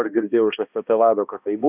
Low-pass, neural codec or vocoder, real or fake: 3.6 kHz; codec, 16 kHz, 1 kbps, X-Codec, HuBERT features, trained on balanced general audio; fake